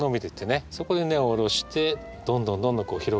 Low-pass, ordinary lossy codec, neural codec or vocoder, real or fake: none; none; none; real